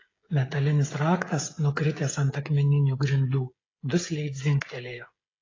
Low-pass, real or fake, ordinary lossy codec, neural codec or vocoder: 7.2 kHz; fake; AAC, 32 kbps; codec, 16 kHz, 16 kbps, FreqCodec, smaller model